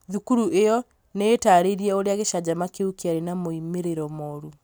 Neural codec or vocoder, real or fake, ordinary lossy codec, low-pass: none; real; none; none